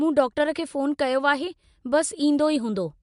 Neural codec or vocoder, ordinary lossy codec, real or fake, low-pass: none; MP3, 64 kbps; real; 10.8 kHz